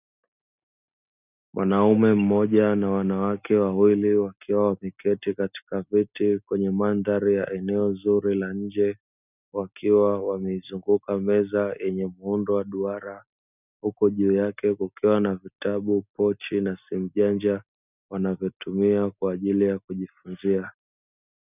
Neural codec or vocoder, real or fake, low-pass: none; real; 3.6 kHz